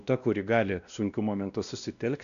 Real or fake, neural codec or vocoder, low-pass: fake; codec, 16 kHz, 1 kbps, X-Codec, WavLM features, trained on Multilingual LibriSpeech; 7.2 kHz